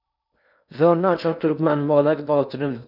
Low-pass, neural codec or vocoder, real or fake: 5.4 kHz; codec, 16 kHz in and 24 kHz out, 0.8 kbps, FocalCodec, streaming, 65536 codes; fake